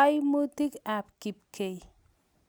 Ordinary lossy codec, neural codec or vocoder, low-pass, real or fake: none; none; none; real